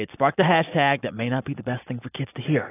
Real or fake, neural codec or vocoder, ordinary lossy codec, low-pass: real; none; AAC, 16 kbps; 3.6 kHz